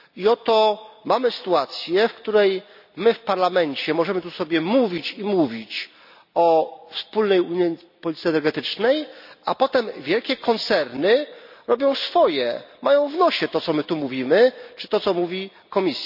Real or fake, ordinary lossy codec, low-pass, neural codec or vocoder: real; none; 5.4 kHz; none